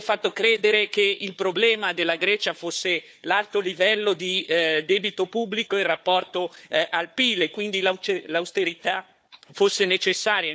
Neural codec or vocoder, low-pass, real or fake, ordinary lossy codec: codec, 16 kHz, 4 kbps, FunCodec, trained on Chinese and English, 50 frames a second; none; fake; none